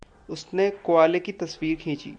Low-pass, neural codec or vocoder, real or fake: 9.9 kHz; none; real